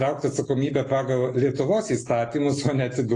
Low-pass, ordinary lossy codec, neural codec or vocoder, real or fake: 10.8 kHz; AAC, 32 kbps; none; real